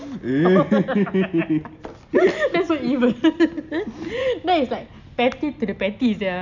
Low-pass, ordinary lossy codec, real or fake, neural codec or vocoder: 7.2 kHz; none; real; none